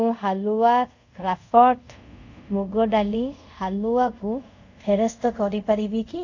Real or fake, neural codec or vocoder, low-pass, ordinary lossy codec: fake; codec, 24 kHz, 0.5 kbps, DualCodec; 7.2 kHz; none